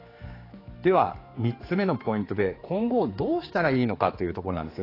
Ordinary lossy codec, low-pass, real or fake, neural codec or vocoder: AAC, 24 kbps; 5.4 kHz; fake; codec, 16 kHz, 4 kbps, X-Codec, HuBERT features, trained on general audio